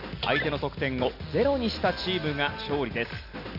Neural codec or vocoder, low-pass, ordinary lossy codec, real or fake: none; 5.4 kHz; none; real